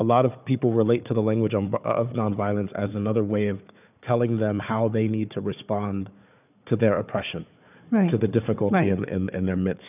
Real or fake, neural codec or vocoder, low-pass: fake; codec, 16 kHz, 4 kbps, FunCodec, trained on Chinese and English, 50 frames a second; 3.6 kHz